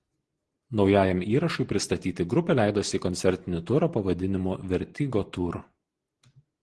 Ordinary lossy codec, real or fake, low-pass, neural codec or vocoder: Opus, 16 kbps; real; 10.8 kHz; none